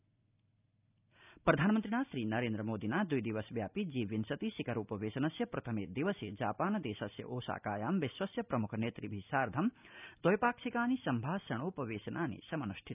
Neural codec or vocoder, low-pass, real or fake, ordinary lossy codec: none; 3.6 kHz; real; none